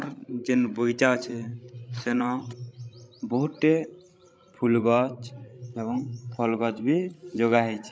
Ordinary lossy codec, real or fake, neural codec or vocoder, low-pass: none; fake; codec, 16 kHz, 16 kbps, FreqCodec, larger model; none